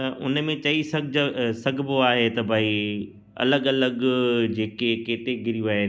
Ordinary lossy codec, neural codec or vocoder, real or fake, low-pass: none; none; real; none